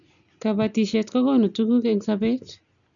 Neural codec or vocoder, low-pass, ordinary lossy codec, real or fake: none; 7.2 kHz; none; real